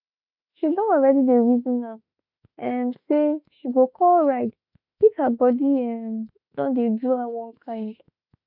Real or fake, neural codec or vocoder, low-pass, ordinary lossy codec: fake; autoencoder, 48 kHz, 32 numbers a frame, DAC-VAE, trained on Japanese speech; 5.4 kHz; none